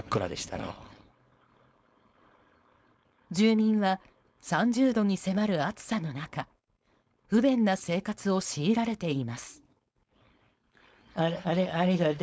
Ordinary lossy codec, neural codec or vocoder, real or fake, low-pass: none; codec, 16 kHz, 4.8 kbps, FACodec; fake; none